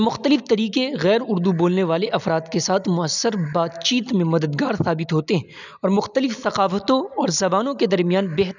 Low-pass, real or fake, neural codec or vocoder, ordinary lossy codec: 7.2 kHz; real; none; none